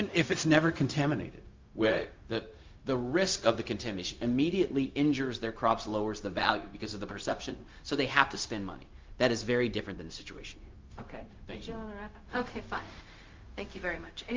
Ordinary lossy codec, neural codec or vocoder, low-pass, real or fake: Opus, 32 kbps; codec, 16 kHz, 0.4 kbps, LongCat-Audio-Codec; 7.2 kHz; fake